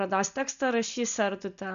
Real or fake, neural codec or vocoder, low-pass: real; none; 7.2 kHz